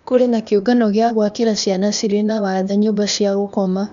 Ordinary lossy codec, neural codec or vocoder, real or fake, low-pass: none; codec, 16 kHz, 0.8 kbps, ZipCodec; fake; 7.2 kHz